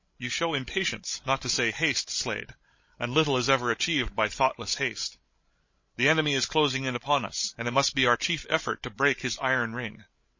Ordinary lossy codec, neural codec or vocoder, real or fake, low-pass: MP3, 32 kbps; codec, 16 kHz, 16 kbps, FreqCodec, larger model; fake; 7.2 kHz